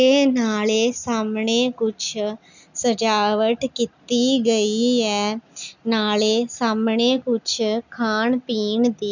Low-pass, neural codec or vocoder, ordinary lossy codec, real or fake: 7.2 kHz; none; none; real